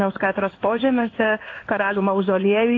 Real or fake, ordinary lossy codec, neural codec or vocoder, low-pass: fake; AAC, 32 kbps; codec, 16 kHz in and 24 kHz out, 1 kbps, XY-Tokenizer; 7.2 kHz